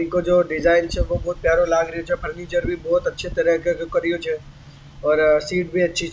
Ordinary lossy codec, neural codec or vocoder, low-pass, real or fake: none; none; none; real